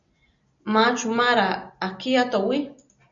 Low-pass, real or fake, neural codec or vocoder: 7.2 kHz; real; none